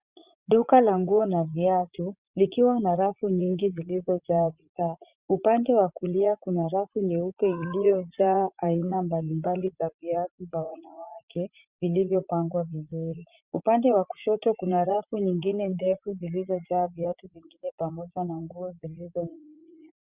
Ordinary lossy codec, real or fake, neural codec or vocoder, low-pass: Opus, 64 kbps; fake; vocoder, 44.1 kHz, 80 mel bands, Vocos; 3.6 kHz